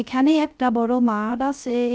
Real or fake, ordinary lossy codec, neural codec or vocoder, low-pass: fake; none; codec, 16 kHz, 0.3 kbps, FocalCodec; none